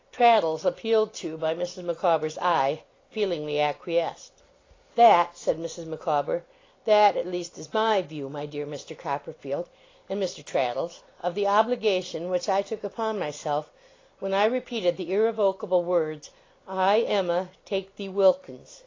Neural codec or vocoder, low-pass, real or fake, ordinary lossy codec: none; 7.2 kHz; real; AAC, 32 kbps